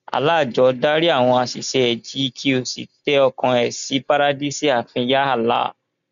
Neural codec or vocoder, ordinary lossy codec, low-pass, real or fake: none; none; 7.2 kHz; real